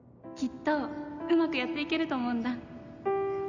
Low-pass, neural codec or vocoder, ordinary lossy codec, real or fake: 7.2 kHz; none; none; real